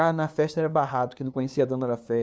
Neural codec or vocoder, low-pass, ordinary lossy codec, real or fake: codec, 16 kHz, 2 kbps, FunCodec, trained on LibriTTS, 25 frames a second; none; none; fake